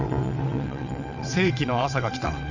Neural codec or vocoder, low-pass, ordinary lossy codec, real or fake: vocoder, 22.05 kHz, 80 mel bands, WaveNeXt; 7.2 kHz; none; fake